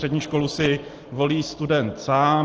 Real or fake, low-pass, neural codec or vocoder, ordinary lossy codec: real; 7.2 kHz; none; Opus, 16 kbps